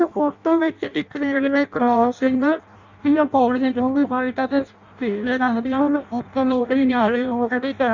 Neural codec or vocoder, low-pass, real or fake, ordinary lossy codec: codec, 16 kHz in and 24 kHz out, 0.6 kbps, FireRedTTS-2 codec; 7.2 kHz; fake; Opus, 64 kbps